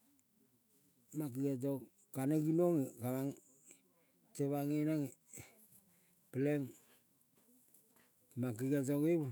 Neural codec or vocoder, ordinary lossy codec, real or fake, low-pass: autoencoder, 48 kHz, 128 numbers a frame, DAC-VAE, trained on Japanese speech; none; fake; none